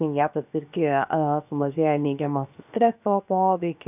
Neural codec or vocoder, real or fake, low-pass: codec, 16 kHz, 0.7 kbps, FocalCodec; fake; 3.6 kHz